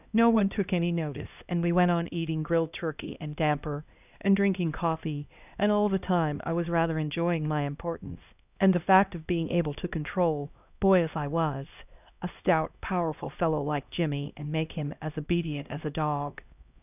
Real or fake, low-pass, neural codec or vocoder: fake; 3.6 kHz; codec, 16 kHz, 1 kbps, X-Codec, HuBERT features, trained on LibriSpeech